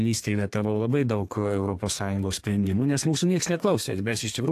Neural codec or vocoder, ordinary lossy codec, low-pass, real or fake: codec, 32 kHz, 1.9 kbps, SNAC; AAC, 64 kbps; 14.4 kHz; fake